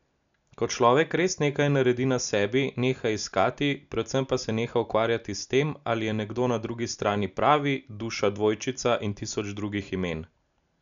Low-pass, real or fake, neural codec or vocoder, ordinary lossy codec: 7.2 kHz; real; none; none